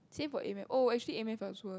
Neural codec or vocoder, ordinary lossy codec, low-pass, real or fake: none; none; none; real